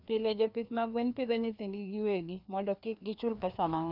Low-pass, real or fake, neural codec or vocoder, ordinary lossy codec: 5.4 kHz; fake; codec, 16 kHz, 2 kbps, FreqCodec, larger model; none